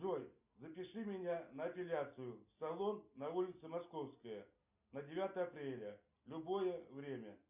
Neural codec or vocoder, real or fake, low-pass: none; real; 3.6 kHz